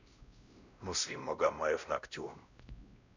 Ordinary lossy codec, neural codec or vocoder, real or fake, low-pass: none; codec, 16 kHz, 1 kbps, X-Codec, WavLM features, trained on Multilingual LibriSpeech; fake; 7.2 kHz